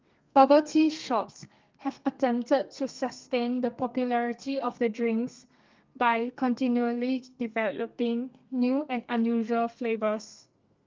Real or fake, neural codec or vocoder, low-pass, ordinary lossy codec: fake; codec, 32 kHz, 1.9 kbps, SNAC; 7.2 kHz; Opus, 32 kbps